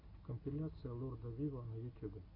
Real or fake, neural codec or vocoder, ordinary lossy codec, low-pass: real; none; AAC, 24 kbps; 5.4 kHz